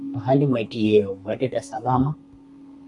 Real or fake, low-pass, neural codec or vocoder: fake; 10.8 kHz; codec, 32 kHz, 1.9 kbps, SNAC